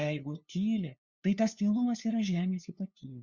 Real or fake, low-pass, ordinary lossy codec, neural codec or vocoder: fake; 7.2 kHz; Opus, 64 kbps; codec, 16 kHz, 2 kbps, FunCodec, trained on LibriTTS, 25 frames a second